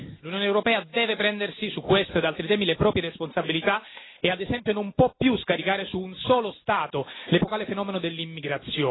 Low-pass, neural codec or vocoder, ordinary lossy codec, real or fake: 7.2 kHz; none; AAC, 16 kbps; real